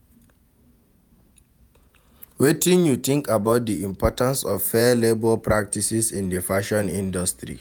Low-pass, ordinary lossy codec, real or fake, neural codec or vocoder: none; none; fake; vocoder, 48 kHz, 128 mel bands, Vocos